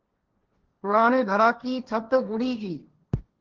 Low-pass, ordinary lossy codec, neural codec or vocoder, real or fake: 7.2 kHz; Opus, 16 kbps; codec, 16 kHz, 1.1 kbps, Voila-Tokenizer; fake